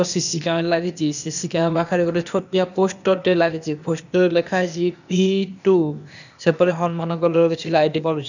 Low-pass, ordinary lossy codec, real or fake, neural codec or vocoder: 7.2 kHz; none; fake; codec, 16 kHz, 0.8 kbps, ZipCodec